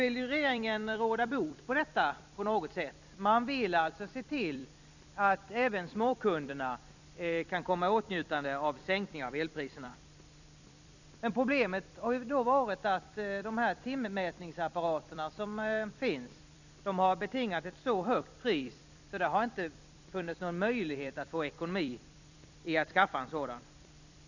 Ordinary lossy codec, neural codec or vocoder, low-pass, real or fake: none; vocoder, 44.1 kHz, 128 mel bands every 256 samples, BigVGAN v2; 7.2 kHz; fake